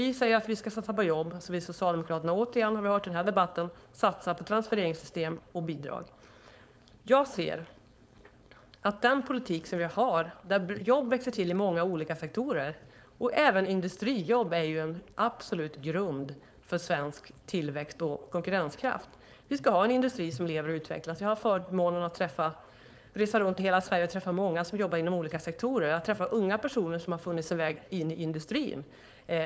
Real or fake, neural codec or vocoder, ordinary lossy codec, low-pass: fake; codec, 16 kHz, 4.8 kbps, FACodec; none; none